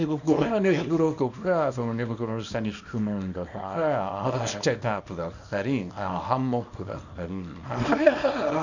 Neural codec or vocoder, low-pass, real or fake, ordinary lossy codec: codec, 24 kHz, 0.9 kbps, WavTokenizer, small release; 7.2 kHz; fake; none